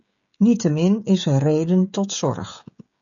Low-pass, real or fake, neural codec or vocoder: 7.2 kHz; fake; codec, 16 kHz, 16 kbps, FreqCodec, smaller model